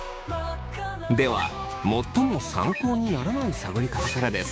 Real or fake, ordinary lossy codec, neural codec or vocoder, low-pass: fake; none; codec, 16 kHz, 6 kbps, DAC; none